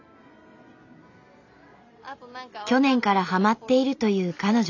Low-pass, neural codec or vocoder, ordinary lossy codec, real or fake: 7.2 kHz; none; none; real